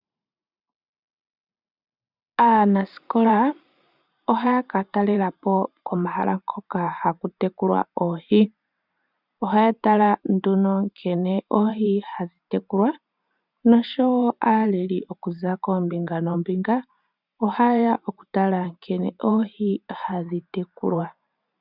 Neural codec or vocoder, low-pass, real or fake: vocoder, 44.1 kHz, 128 mel bands every 512 samples, BigVGAN v2; 5.4 kHz; fake